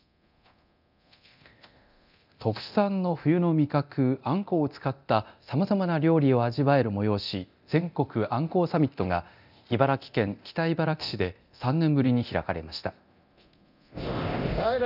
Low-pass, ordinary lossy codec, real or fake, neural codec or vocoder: 5.4 kHz; none; fake; codec, 24 kHz, 0.9 kbps, DualCodec